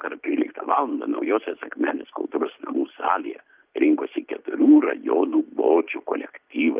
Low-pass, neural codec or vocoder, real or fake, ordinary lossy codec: 3.6 kHz; none; real; Opus, 32 kbps